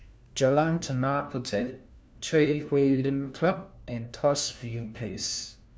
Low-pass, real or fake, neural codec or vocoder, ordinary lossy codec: none; fake; codec, 16 kHz, 1 kbps, FunCodec, trained on LibriTTS, 50 frames a second; none